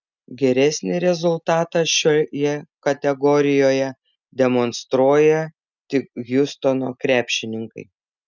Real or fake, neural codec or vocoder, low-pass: real; none; 7.2 kHz